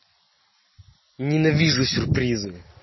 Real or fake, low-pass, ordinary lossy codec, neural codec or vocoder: real; 7.2 kHz; MP3, 24 kbps; none